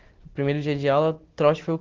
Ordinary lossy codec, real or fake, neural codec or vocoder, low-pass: Opus, 16 kbps; real; none; 7.2 kHz